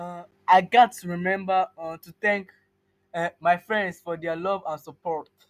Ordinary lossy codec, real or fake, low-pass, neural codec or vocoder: none; real; 14.4 kHz; none